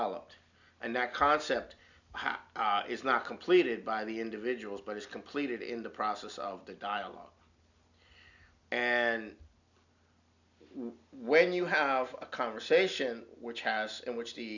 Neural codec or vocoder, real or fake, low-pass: none; real; 7.2 kHz